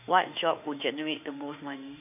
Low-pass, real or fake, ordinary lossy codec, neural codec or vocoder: 3.6 kHz; fake; none; autoencoder, 48 kHz, 32 numbers a frame, DAC-VAE, trained on Japanese speech